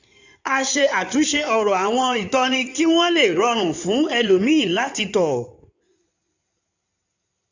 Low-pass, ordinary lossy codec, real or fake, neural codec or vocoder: 7.2 kHz; none; fake; codec, 16 kHz in and 24 kHz out, 2.2 kbps, FireRedTTS-2 codec